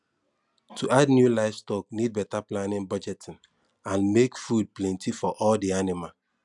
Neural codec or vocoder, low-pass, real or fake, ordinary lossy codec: none; 10.8 kHz; real; none